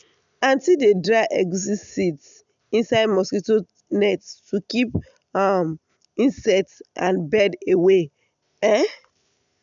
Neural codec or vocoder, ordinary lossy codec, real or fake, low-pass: none; none; real; 7.2 kHz